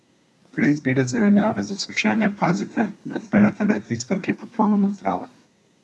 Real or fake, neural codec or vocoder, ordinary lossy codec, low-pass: fake; codec, 24 kHz, 1 kbps, SNAC; none; none